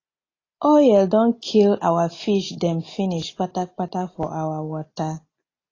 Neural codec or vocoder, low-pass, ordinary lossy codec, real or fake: none; 7.2 kHz; AAC, 32 kbps; real